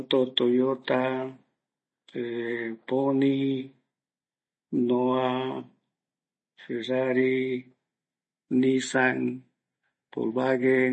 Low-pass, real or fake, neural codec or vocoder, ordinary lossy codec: 10.8 kHz; real; none; MP3, 32 kbps